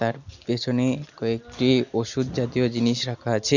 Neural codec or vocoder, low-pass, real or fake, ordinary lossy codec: none; 7.2 kHz; real; none